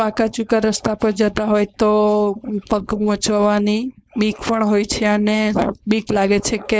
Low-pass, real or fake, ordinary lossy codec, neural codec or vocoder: none; fake; none; codec, 16 kHz, 4.8 kbps, FACodec